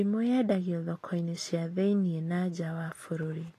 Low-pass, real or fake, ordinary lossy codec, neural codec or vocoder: 14.4 kHz; real; AAC, 48 kbps; none